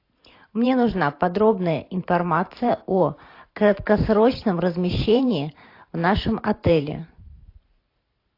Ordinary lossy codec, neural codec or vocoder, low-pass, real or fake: AAC, 32 kbps; vocoder, 44.1 kHz, 128 mel bands every 512 samples, BigVGAN v2; 5.4 kHz; fake